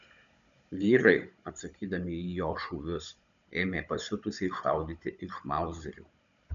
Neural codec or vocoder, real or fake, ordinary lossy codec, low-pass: codec, 16 kHz, 16 kbps, FunCodec, trained on Chinese and English, 50 frames a second; fake; MP3, 96 kbps; 7.2 kHz